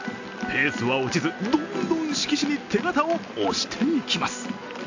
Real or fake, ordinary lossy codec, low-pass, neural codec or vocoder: real; AAC, 48 kbps; 7.2 kHz; none